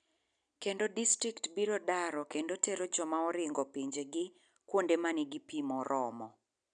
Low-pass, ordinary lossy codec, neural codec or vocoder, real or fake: 9.9 kHz; none; none; real